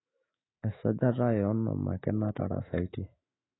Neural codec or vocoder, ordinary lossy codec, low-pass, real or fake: autoencoder, 48 kHz, 128 numbers a frame, DAC-VAE, trained on Japanese speech; AAC, 16 kbps; 7.2 kHz; fake